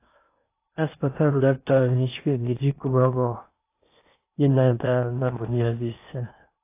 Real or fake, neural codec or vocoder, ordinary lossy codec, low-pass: fake; codec, 16 kHz in and 24 kHz out, 0.8 kbps, FocalCodec, streaming, 65536 codes; AAC, 24 kbps; 3.6 kHz